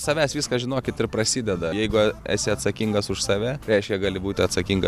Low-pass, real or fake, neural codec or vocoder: 14.4 kHz; real; none